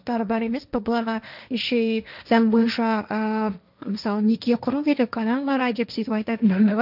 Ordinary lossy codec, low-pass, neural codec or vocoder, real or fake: none; 5.4 kHz; codec, 16 kHz, 1.1 kbps, Voila-Tokenizer; fake